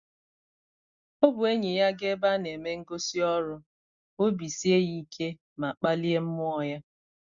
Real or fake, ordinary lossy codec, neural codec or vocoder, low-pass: real; Opus, 64 kbps; none; 7.2 kHz